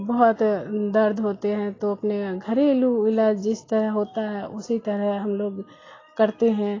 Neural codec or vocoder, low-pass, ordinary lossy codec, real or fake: none; 7.2 kHz; AAC, 32 kbps; real